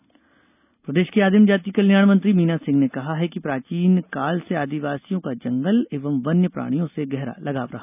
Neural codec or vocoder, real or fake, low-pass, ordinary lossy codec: none; real; 3.6 kHz; none